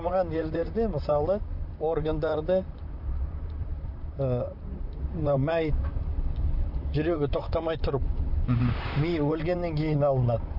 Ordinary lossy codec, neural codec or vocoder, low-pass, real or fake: none; vocoder, 44.1 kHz, 128 mel bands, Pupu-Vocoder; 5.4 kHz; fake